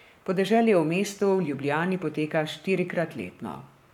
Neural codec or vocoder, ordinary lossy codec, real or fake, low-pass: codec, 44.1 kHz, 7.8 kbps, Pupu-Codec; none; fake; 19.8 kHz